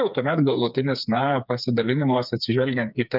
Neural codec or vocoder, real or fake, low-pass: codec, 16 kHz, 4 kbps, FreqCodec, smaller model; fake; 5.4 kHz